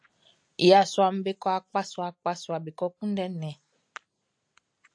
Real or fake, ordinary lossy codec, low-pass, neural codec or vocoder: real; AAC, 64 kbps; 9.9 kHz; none